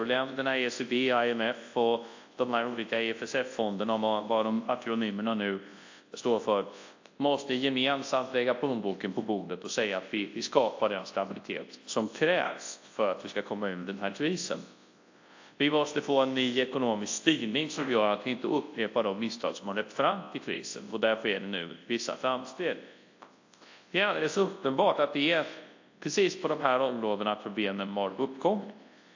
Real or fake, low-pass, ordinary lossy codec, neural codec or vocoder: fake; 7.2 kHz; AAC, 48 kbps; codec, 24 kHz, 0.9 kbps, WavTokenizer, large speech release